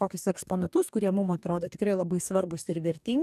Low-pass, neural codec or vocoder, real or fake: 14.4 kHz; codec, 44.1 kHz, 2.6 kbps, DAC; fake